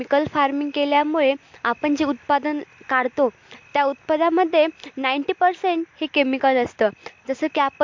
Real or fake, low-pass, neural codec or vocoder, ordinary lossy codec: real; 7.2 kHz; none; MP3, 48 kbps